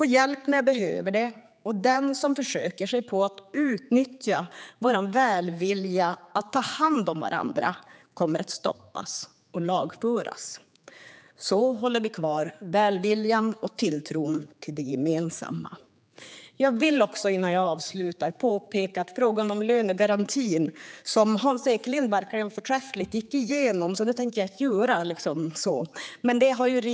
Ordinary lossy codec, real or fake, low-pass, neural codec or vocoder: none; fake; none; codec, 16 kHz, 4 kbps, X-Codec, HuBERT features, trained on general audio